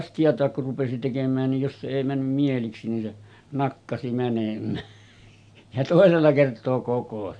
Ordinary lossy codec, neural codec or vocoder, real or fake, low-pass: AAC, 64 kbps; none; real; 9.9 kHz